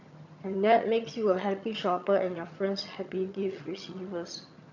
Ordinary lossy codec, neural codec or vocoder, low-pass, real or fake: none; vocoder, 22.05 kHz, 80 mel bands, HiFi-GAN; 7.2 kHz; fake